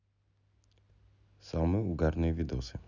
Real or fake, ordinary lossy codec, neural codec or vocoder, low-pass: real; none; none; 7.2 kHz